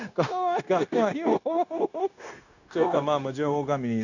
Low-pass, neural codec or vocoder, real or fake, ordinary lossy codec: 7.2 kHz; codec, 16 kHz, 0.9 kbps, LongCat-Audio-Codec; fake; none